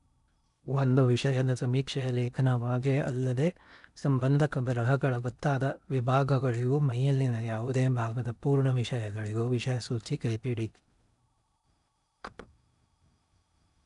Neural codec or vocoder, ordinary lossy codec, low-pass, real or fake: codec, 16 kHz in and 24 kHz out, 0.8 kbps, FocalCodec, streaming, 65536 codes; none; 10.8 kHz; fake